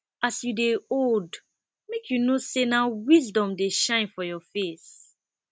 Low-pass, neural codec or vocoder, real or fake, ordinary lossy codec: none; none; real; none